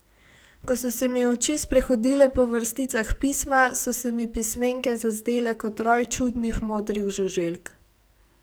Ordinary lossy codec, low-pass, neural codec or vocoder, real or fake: none; none; codec, 44.1 kHz, 2.6 kbps, SNAC; fake